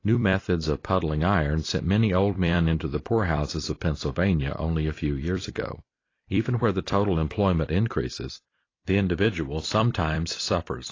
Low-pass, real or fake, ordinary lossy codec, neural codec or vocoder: 7.2 kHz; fake; AAC, 32 kbps; vocoder, 44.1 kHz, 128 mel bands every 256 samples, BigVGAN v2